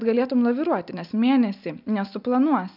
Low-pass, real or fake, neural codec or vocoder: 5.4 kHz; real; none